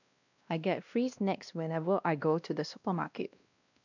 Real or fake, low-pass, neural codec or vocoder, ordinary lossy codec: fake; 7.2 kHz; codec, 16 kHz, 1 kbps, X-Codec, WavLM features, trained on Multilingual LibriSpeech; none